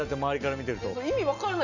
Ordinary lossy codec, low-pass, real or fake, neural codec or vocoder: none; 7.2 kHz; real; none